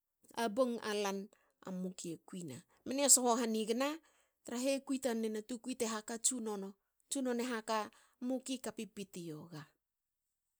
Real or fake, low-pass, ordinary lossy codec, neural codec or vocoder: real; none; none; none